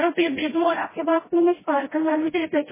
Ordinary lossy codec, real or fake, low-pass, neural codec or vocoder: MP3, 16 kbps; fake; 3.6 kHz; codec, 16 kHz, 0.5 kbps, FreqCodec, smaller model